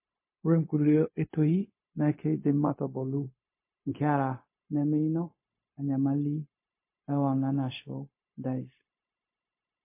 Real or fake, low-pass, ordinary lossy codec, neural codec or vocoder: fake; 3.6 kHz; MP3, 24 kbps; codec, 16 kHz, 0.4 kbps, LongCat-Audio-Codec